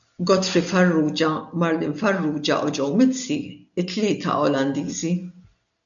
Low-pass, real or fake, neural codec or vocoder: 7.2 kHz; real; none